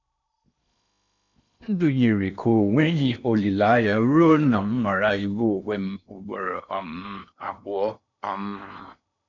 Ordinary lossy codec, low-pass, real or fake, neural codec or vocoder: none; 7.2 kHz; fake; codec, 16 kHz in and 24 kHz out, 0.8 kbps, FocalCodec, streaming, 65536 codes